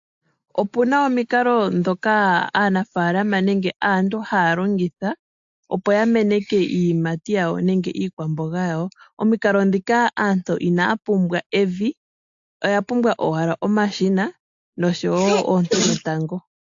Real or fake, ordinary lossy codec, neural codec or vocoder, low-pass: real; AAC, 64 kbps; none; 7.2 kHz